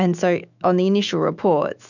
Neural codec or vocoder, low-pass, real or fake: autoencoder, 48 kHz, 128 numbers a frame, DAC-VAE, trained on Japanese speech; 7.2 kHz; fake